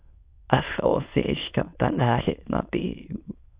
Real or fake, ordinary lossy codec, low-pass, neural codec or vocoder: fake; Opus, 64 kbps; 3.6 kHz; autoencoder, 22.05 kHz, a latent of 192 numbers a frame, VITS, trained on many speakers